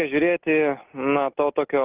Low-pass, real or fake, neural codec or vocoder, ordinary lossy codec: 3.6 kHz; real; none; Opus, 32 kbps